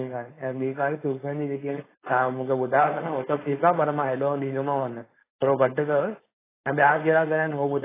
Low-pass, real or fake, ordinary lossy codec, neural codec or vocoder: 3.6 kHz; fake; AAC, 16 kbps; codec, 16 kHz, 4.8 kbps, FACodec